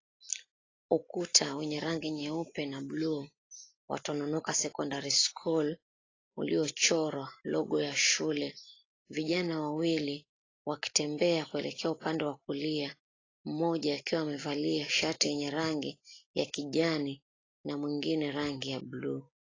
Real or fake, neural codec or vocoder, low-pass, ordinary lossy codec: real; none; 7.2 kHz; AAC, 32 kbps